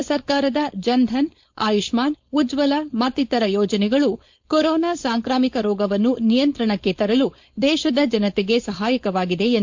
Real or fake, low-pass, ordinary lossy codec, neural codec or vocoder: fake; 7.2 kHz; MP3, 48 kbps; codec, 16 kHz, 4.8 kbps, FACodec